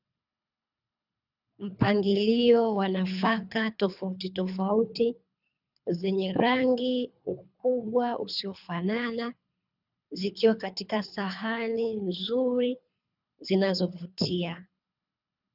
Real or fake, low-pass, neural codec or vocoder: fake; 5.4 kHz; codec, 24 kHz, 3 kbps, HILCodec